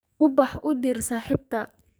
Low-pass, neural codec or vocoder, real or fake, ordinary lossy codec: none; codec, 44.1 kHz, 2.6 kbps, SNAC; fake; none